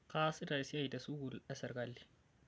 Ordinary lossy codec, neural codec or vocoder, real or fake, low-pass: none; none; real; none